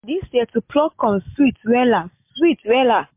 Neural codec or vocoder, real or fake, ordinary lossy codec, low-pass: none; real; MP3, 32 kbps; 3.6 kHz